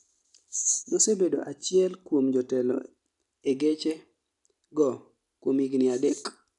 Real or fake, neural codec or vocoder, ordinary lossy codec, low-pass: real; none; none; 10.8 kHz